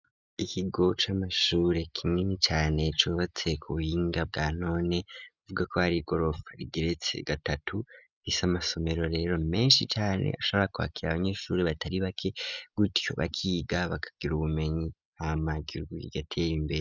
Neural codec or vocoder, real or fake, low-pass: none; real; 7.2 kHz